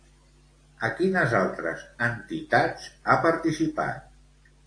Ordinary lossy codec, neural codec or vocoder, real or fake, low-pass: AAC, 48 kbps; none; real; 9.9 kHz